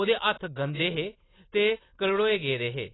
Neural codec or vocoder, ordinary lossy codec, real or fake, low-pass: none; AAC, 16 kbps; real; 7.2 kHz